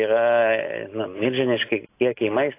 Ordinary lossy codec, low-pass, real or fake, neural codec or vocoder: AAC, 24 kbps; 3.6 kHz; real; none